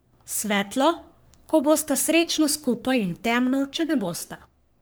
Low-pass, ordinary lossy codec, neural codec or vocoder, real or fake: none; none; codec, 44.1 kHz, 3.4 kbps, Pupu-Codec; fake